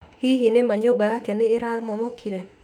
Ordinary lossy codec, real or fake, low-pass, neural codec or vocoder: none; fake; 19.8 kHz; autoencoder, 48 kHz, 32 numbers a frame, DAC-VAE, trained on Japanese speech